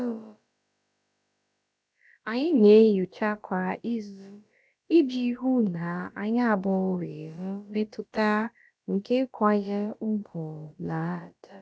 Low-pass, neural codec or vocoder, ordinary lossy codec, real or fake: none; codec, 16 kHz, about 1 kbps, DyCAST, with the encoder's durations; none; fake